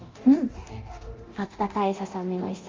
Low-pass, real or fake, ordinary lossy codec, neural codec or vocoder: 7.2 kHz; fake; Opus, 32 kbps; codec, 24 kHz, 0.9 kbps, DualCodec